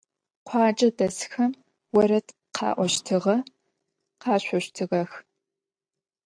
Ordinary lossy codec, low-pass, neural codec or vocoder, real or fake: AAC, 64 kbps; 9.9 kHz; none; real